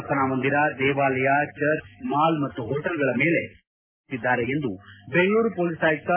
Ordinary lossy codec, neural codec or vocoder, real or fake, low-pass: MP3, 24 kbps; none; real; 3.6 kHz